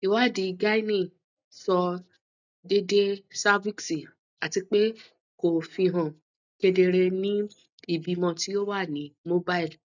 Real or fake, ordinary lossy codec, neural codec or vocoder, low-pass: real; none; none; 7.2 kHz